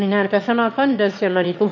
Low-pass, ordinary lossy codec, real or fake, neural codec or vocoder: 7.2 kHz; MP3, 48 kbps; fake; autoencoder, 22.05 kHz, a latent of 192 numbers a frame, VITS, trained on one speaker